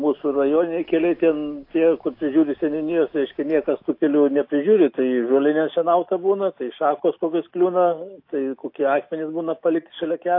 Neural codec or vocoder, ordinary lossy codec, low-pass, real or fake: none; AAC, 32 kbps; 5.4 kHz; real